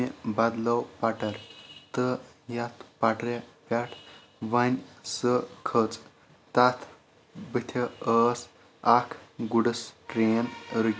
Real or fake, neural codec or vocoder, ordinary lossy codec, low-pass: real; none; none; none